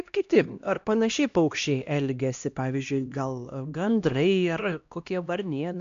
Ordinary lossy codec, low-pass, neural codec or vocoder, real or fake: AAC, 64 kbps; 7.2 kHz; codec, 16 kHz, 1 kbps, X-Codec, HuBERT features, trained on LibriSpeech; fake